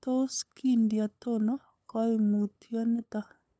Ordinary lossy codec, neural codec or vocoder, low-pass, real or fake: none; codec, 16 kHz, 8 kbps, FunCodec, trained on LibriTTS, 25 frames a second; none; fake